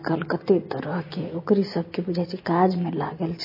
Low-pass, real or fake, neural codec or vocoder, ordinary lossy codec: 5.4 kHz; real; none; MP3, 24 kbps